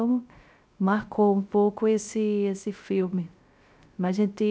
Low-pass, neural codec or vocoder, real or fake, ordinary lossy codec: none; codec, 16 kHz, 0.3 kbps, FocalCodec; fake; none